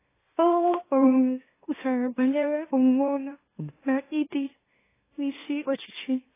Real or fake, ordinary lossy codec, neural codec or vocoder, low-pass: fake; AAC, 16 kbps; autoencoder, 44.1 kHz, a latent of 192 numbers a frame, MeloTTS; 3.6 kHz